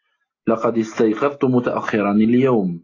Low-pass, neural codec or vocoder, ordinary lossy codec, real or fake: 7.2 kHz; none; AAC, 32 kbps; real